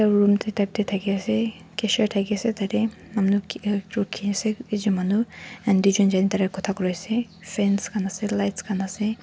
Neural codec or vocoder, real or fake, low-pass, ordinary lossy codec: none; real; none; none